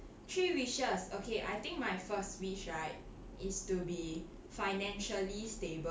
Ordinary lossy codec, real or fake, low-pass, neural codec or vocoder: none; real; none; none